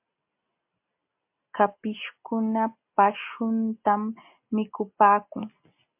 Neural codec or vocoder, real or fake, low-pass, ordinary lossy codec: none; real; 3.6 kHz; MP3, 32 kbps